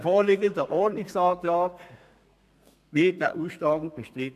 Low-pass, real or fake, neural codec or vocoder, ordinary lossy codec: 14.4 kHz; fake; codec, 32 kHz, 1.9 kbps, SNAC; none